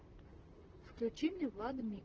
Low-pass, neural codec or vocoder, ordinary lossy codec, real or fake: 7.2 kHz; vocoder, 44.1 kHz, 128 mel bands, Pupu-Vocoder; Opus, 16 kbps; fake